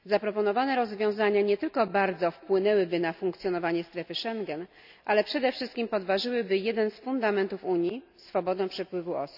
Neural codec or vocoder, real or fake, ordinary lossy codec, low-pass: none; real; none; 5.4 kHz